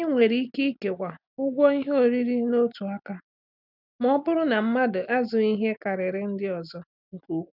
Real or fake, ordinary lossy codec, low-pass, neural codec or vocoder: real; none; 5.4 kHz; none